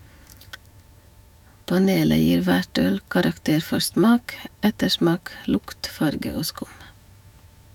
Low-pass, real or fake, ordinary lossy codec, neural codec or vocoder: 19.8 kHz; fake; none; autoencoder, 48 kHz, 128 numbers a frame, DAC-VAE, trained on Japanese speech